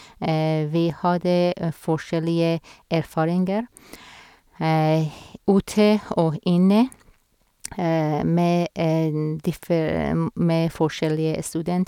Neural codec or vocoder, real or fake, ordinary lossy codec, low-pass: none; real; none; 19.8 kHz